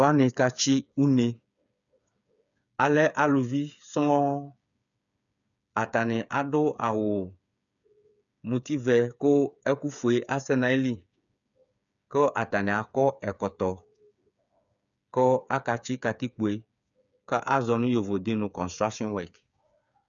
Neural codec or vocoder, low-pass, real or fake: codec, 16 kHz, 8 kbps, FreqCodec, smaller model; 7.2 kHz; fake